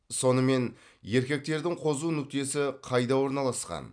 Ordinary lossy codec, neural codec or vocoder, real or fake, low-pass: none; none; real; 9.9 kHz